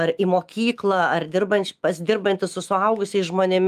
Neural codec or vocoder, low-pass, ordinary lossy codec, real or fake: autoencoder, 48 kHz, 128 numbers a frame, DAC-VAE, trained on Japanese speech; 14.4 kHz; Opus, 32 kbps; fake